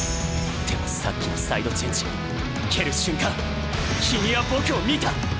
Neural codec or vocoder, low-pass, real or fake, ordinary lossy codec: none; none; real; none